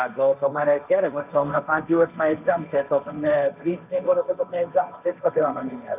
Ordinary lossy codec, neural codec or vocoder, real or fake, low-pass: none; codec, 16 kHz, 1.1 kbps, Voila-Tokenizer; fake; 3.6 kHz